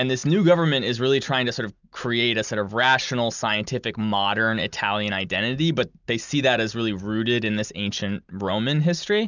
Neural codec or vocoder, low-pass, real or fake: none; 7.2 kHz; real